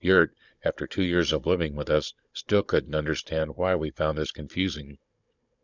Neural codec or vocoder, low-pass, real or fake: codec, 16 kHz, 16 kbps, FunCodec, trained on Chinese and English, 50 frames a second; 7.2 kHz; fake